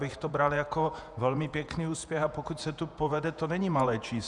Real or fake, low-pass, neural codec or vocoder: fake; 10.8 kHz; vocoder, 48 kHz, 128 mel bands, Vocos